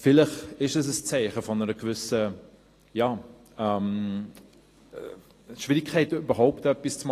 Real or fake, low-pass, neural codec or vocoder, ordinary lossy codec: real; 14.4 kHz; none; AAC, 48 kbps